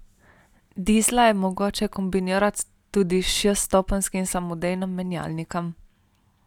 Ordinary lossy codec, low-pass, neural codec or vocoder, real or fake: none; 19.8 kHz; none; real